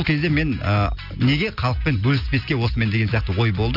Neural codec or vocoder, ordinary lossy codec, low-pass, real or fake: none; none; 5.4 kHz; real